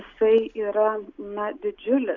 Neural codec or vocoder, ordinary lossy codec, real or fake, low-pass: none; AAC, 48 kbps; real; 7.2 kHz